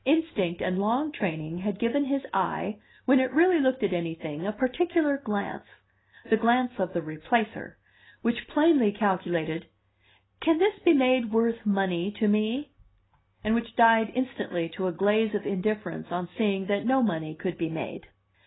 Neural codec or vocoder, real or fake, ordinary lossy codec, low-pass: none; real; AAC, 16 kbps; 7.2 kHz